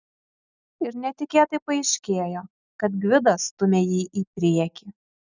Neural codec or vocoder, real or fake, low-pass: none; real; 7.2 kHz